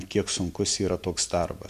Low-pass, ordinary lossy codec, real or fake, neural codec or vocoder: 14.4 kHz; MP3, 96 kbps; real; none